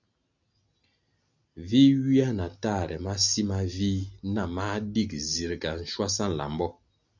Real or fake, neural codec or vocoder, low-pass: real; none; 7.2 kHz